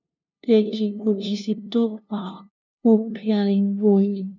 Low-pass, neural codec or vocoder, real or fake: 7.2 kHz; codec, 16 kHz, 0.5 kbps, FunCodec, trained on LibriTTS, 25 frames a second; fake